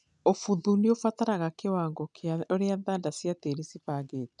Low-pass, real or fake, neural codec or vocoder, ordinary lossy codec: 10.8 kHz; real; none; none